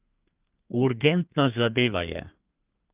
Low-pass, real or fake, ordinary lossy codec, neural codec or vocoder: 3.6 kHz; fake; Opus, 32 kbps; codec, 32 kHz, 1.9 kbps, SNAC